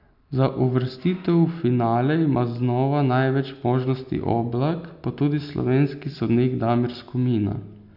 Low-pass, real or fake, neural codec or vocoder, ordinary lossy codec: 5.4 kHz; real; none; none